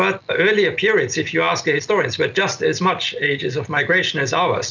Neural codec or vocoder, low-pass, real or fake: none; 7.2 kHz; real